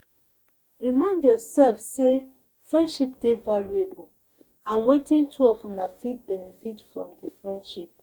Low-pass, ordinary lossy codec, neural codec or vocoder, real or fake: 19.8 kHz; Opus, 64 kbps; codec, 44.1 kHz, 2.6 kbps, DAC; fake